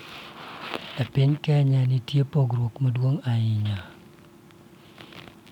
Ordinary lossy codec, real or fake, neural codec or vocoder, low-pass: none; real; none; 19.8 kHz